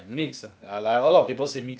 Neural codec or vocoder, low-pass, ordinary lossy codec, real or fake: codec, 16 kHz, 0.8 kbps, ZipCodec; none; none; fake